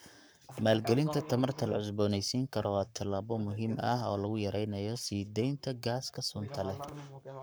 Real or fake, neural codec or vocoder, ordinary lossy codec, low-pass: fake; codec, 44.1 kHz, 7.8 kbps, Pupu-Codec; none; none